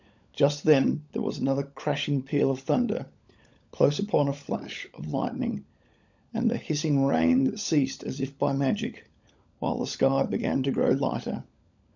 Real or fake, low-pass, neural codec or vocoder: fake; 7.2 kHz; codec, 16 kHz, 16 kbps, FunCodec, trained on LibriTTS, 50 frames a second